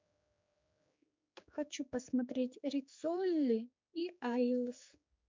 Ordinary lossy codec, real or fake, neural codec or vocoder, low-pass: MP3, 64 kbps; fake; codec, 16 kHz, 4 kbps, X-Codec, HuBERT features, trained on general audio; 7.2 kHz